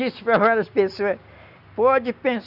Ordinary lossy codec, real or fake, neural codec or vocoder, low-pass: none; real; none; 5.4 kHz